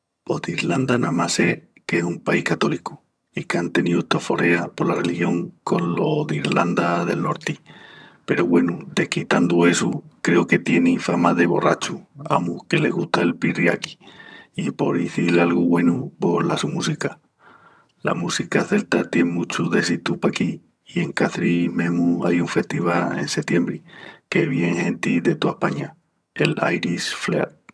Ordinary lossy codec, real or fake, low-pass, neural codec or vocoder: none; fake; none; vocoder, 22.05 kHz, 80 mel bands, HiFi-GAN